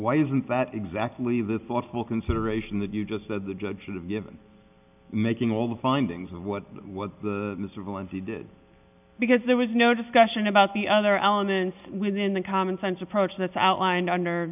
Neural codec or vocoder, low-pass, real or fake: none; 3.6 kHz; real